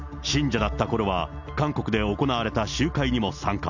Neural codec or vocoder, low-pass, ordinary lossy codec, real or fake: none; 7.2 kHz; none; real